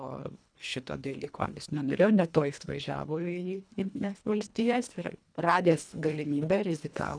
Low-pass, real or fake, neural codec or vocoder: 9.9 kHz; fake; codec, 24 kHz, 1.5 kbps, HILCodec